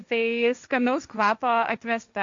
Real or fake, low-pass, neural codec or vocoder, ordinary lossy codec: fake; 7.2 kHz; codec, 16 kHz, 1.1 kbps, Voila-Tokenizer; Opus, 64 kbps